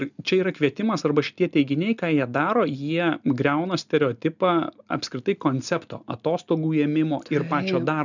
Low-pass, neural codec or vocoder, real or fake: 7.2 kHz; none; real